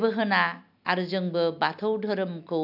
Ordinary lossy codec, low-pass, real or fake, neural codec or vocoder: AAC, 48 kbps; 5.4 kHz; real; none